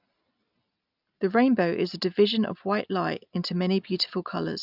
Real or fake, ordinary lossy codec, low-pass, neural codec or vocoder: real; none; 5.4 kHz; none